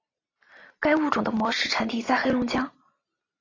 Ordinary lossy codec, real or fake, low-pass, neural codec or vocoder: AAC, 32 kbps; real; 7.2 kHz; none